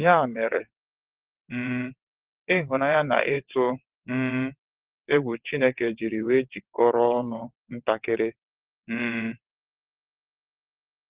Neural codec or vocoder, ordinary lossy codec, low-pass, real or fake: vocoder, 22.05 kHz, 80 mel bands, WaveNeXt; Opus, 16 kbps; 3.6 kHz; fake